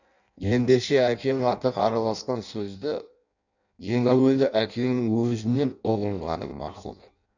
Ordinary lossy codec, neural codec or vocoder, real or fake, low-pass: none; codec, 16 kHz in and 24 kHz out, 0.6 kbps, FireRedTTS-2 codec; fake; 7.2 kHz